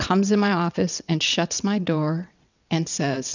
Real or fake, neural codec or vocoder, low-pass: fake; vocoder, 22.05 kHz, 80 mel bands, WaveNeXt; 7.2 kHz